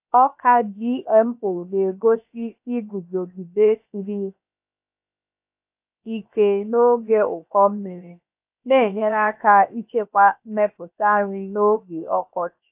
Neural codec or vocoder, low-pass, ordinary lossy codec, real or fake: codec, 16 kHz, 0.7 kbps, FocalCodec; 3.6 kHz; AAC, 32 kbps; fake